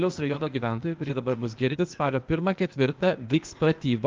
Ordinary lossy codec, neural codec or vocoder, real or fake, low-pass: Opus, 24 kbps; codec, 16 kHz, 0.8 kbps, ZipCodec; fake; 7.2 kHz